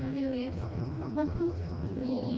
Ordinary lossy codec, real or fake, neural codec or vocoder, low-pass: none; fake; codec, 16 kHz, 1 kbps, FreqCodec, smaller model; none